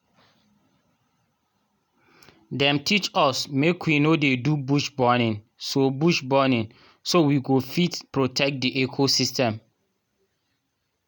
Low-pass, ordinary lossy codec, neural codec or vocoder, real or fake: 19.8 kHz; none; none; real